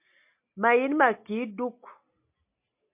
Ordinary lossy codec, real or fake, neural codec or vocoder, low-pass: MP3, 32 kbps; real; none; 3.6 kHz